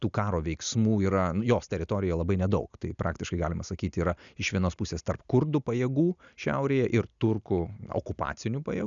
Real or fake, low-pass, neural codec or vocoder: real; 7.2 kHz; none